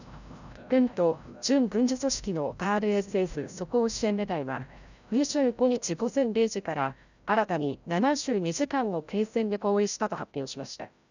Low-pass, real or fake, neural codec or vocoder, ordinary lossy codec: 7.2 kHz; fake; codec, 16 kHz, 0.5 kbps, FreqCodec, larger model; none